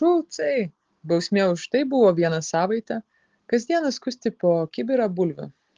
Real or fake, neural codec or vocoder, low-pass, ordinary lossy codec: real; none; 7.2 kHz; Opus, 16 kbps